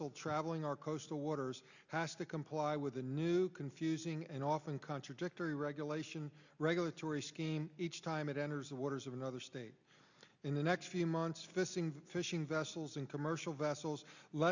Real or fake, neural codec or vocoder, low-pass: real; none; 7.2 kHz